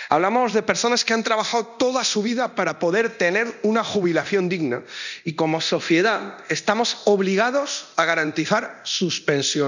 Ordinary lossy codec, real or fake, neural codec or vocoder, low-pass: none; fake; codec, 24 kHz, 0.9 kbps, DualCodec; 7.2 kHz